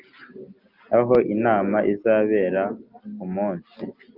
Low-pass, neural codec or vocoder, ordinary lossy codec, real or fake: 5.4 kHz; none; Opus, 24 kbps; real